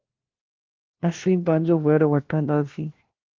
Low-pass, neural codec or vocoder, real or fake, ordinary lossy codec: 7.2 kHz; codec, 16 kHz, 1 kbps, FunCodec, trained on LibriTTS, 50 frames a second; fake; Opus, 16 kbps